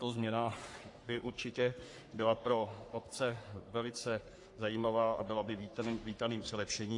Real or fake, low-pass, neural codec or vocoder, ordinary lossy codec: fake; 10.8 kHz; codec, 44.1 kHz, 3.4 kbps, Pupu-Codec; AAC, 48 kbps